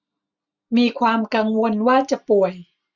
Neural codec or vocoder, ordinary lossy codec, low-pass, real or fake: none; none; 7.2 kHz; real